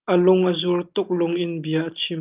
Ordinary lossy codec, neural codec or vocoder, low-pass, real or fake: Opus, 24 kbps; none; 3.6 kHz; real